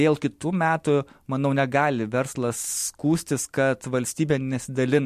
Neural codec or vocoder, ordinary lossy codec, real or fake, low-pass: none; MP3, 64 kbps; real; 14.4 kHz